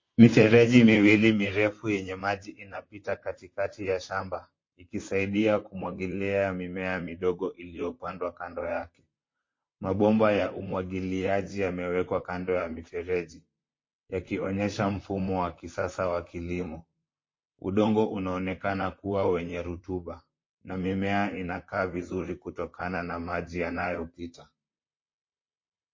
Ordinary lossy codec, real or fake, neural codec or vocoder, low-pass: MP3, 32 kbps; fake; vocoder, 44.1 kHz, 128 mel bands, Pupu-Vocoder; 7.2 kHz